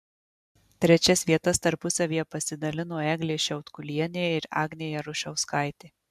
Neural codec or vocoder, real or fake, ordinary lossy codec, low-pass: none; real; MP3, 96 kbps; 14.4 kHz